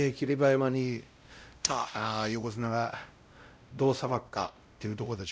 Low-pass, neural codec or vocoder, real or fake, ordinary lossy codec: none; codec, 16 kHz, 0.5 kbps, X-Codec, WavLM features, trained on Multilingual LibriSpeech; fake; none